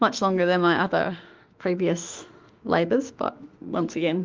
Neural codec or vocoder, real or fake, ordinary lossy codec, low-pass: autoencoder, 48 kHz, 32 numbers a frame, DAC-VAE, trained on Japanese speech; fake; Opus, 32 kbps; 7.2 kHz